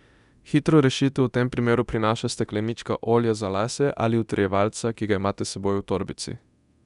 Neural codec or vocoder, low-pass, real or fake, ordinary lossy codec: codec, 24 kHz, 0.9 kbps, DualCodec; 10.8 kHz; fake; none